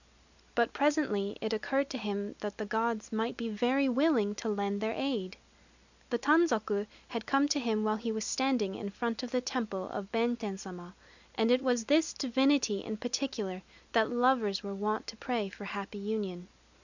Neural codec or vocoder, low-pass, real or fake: none; 7.2 kHz; real